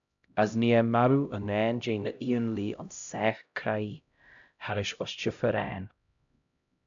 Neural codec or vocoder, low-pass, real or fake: codec, 16 kHz, 0.5 kbps, X-Codec, HuBERT features, trained on LibriSpeech; 7.2 kHz; fake